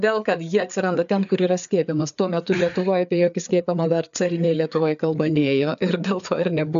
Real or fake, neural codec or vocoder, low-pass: fake; codec, 16 kHz, 4 kbps, FreqCodec, larger model; 7.2 kHz